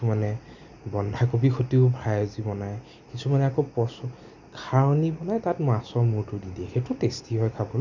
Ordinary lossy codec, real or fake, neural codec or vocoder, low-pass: none; real; none; 7.2 kHz